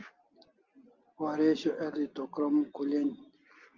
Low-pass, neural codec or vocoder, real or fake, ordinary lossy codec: 7.2 kHz; none; real; Opus, 24 kbps